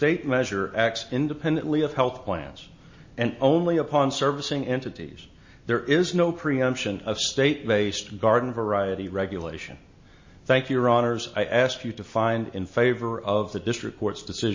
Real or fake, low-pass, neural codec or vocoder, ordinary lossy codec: real; 7.2 kHz; none; MP3, 32 kbps